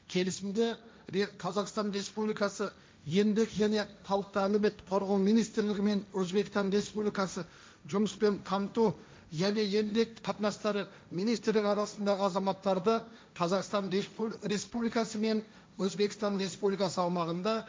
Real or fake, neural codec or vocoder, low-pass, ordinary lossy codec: fake; codec, 16 kHz, 1.1 kbps, Voila-Tokenizer; none; none